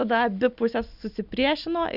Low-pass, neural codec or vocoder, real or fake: 5.4 kHz; none; real